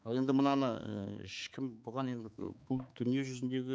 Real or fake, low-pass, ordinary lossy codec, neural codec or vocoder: fake; none; none; codec, 16 kHz, 4 kbps, X-Codec, HuBERT features, trained on balanced general audio